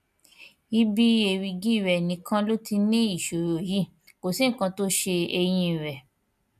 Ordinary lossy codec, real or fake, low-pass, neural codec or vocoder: none; real; 14.4 kHz; none